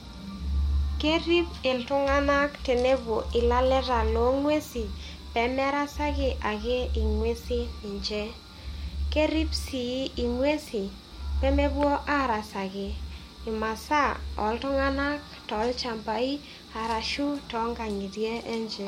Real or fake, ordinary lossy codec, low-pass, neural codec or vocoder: real; MP3, 64 kbps; 19.8 kHz; none